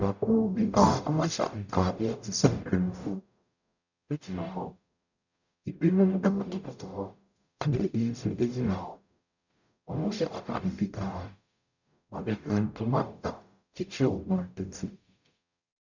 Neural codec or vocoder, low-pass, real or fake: codec, 44.1 kHz, 0.9 kbps, DAC; 7.2 kHz; fake